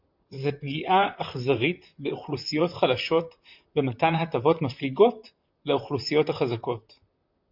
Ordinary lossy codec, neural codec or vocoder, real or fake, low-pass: MP3, 48 kbps; vocoder, 44.1 kHz, 128 mel bands, Pupu-Vocoder; fake; 5.4 kHz